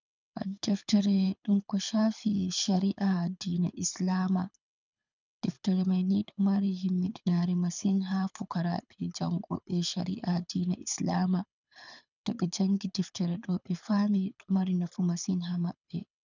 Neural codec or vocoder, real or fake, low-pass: codec, 24 kHz, 6 kbps, HILCodec; fake; 7.2 kHz